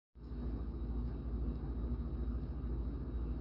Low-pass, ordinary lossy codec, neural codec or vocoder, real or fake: 5.4 kHz; Opus, 64 kbps; none; real